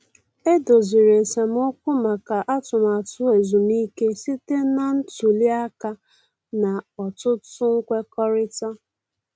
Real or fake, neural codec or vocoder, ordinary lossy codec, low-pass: real; none; none; none